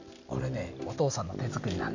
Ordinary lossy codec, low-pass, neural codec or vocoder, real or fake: none; 7.2 kHz; none; real